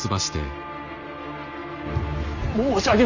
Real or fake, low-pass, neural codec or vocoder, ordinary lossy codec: real; 7.2 kHz; none; none